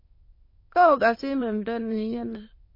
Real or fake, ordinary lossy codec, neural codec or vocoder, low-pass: fake; MP3, 24 kbps; autoencoder, 22.05 kHz, a latent of 192 numbers a frame, VITS, trained on many speakers; 5.4 kHz